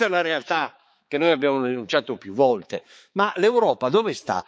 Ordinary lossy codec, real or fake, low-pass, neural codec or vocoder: none; fake; none; codec, 16 kHz, 4 kbps, X-Codec, HuBERT features, trained on balanced general audio